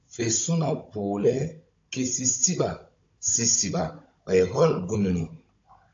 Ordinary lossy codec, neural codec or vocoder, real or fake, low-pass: AAC, 48 kbps; codec, 16 kHz, 16 kbps, FunCodec, trained on Chinese and English, 50 frames a second; fake; 7.2 kHz